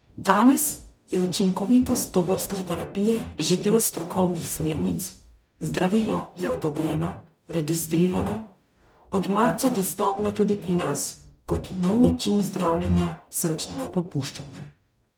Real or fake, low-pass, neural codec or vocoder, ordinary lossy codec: fake; none; codec, 44.1 kHz, 0.9 kbps, DAC; none